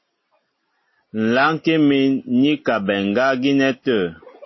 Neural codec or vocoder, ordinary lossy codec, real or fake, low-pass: none; MP3, 24 kbps; real; 7.2 kHz